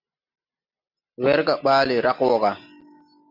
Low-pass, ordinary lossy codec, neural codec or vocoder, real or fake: 5.4 kHz; MP3, 48 kbps; none; real